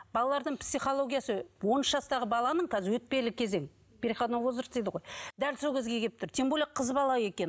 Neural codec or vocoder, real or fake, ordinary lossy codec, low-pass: none; real; none; none